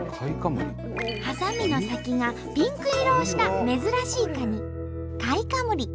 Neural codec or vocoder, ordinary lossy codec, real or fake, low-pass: none; none; real; none